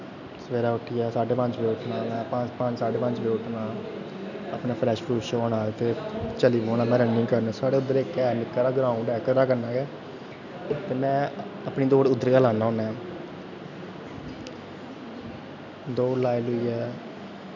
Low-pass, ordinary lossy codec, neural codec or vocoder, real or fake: 7.2 kHz; none; none; real